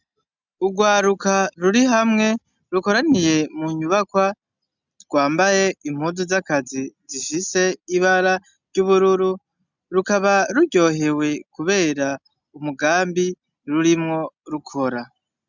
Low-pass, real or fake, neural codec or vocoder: 7.2 kHz; real; none